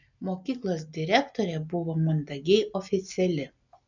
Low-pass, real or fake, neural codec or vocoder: 7.2 kHz; real; none